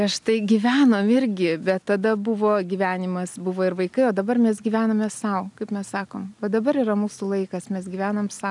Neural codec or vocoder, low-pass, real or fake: none; 10.8 kHz; real